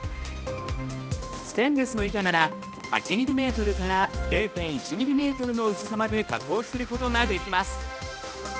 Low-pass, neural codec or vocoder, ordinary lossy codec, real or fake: none; codec, 16 kHz, 1 kbps, X-Codec, HuBERT features, trained on balanced general audio; none; fake